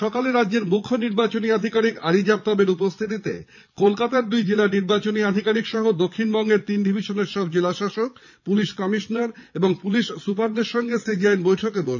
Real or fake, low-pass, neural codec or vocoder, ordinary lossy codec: fake; 7.2 kHz; vocoder, 22.05 kHz, 80 mel bands, Vocos; none